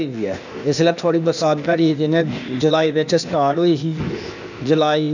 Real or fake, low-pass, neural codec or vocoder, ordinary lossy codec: fake; 7.2 kHz; codec, 16 kHz, 0.8 kbps, ZipCodec; none